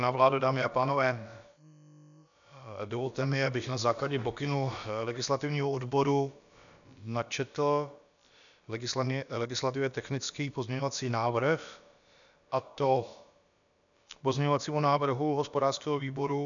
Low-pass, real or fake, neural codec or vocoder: 7.2 kHz; fake; codec, 16 kHz, about 1 kbps, DyCAST, with the encoder's durations